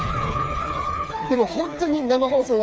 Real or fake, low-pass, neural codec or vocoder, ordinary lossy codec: fake; none; codec, 16 kHz, 4 kbps, FreqCodec, smaller model; none